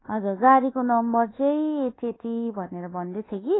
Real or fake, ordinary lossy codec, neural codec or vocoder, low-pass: fake; AAC, 16 kbps; codec, 24 kHz, 1.2 kbps, DualCodec; 7.2 kHz